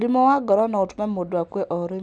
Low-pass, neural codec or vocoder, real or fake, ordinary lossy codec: 9.9 kHz; none; real; none